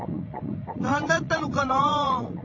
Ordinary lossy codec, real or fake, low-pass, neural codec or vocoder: AAC, 48 kbps; fake; 7.2 kHz; vocoder, 44.1 kHz, 80 mel bands, Vocos